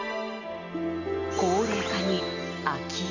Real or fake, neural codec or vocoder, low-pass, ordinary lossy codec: real; none; 7.2 kHz; none